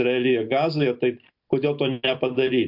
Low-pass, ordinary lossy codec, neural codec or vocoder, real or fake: 5.4 kHz; MP3, 48 kbps; none; real